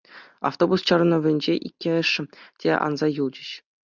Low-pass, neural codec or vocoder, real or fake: 7.2 kHz; none; real